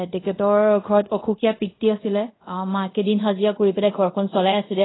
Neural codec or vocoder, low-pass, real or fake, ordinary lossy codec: codec, 24 kHz, 0.5 kbps, DualCodec; 7.2 kHz; fake; AAC, 16 kbps